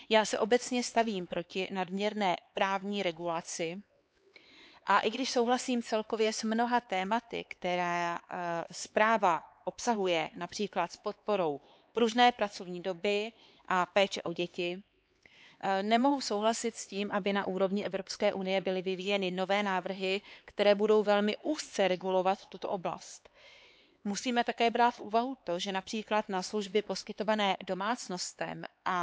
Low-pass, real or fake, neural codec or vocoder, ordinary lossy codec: none; fake; codec, 16 kHz, 4 kbps, X-Codec, HuBERT features, trained on LibriSpeech; none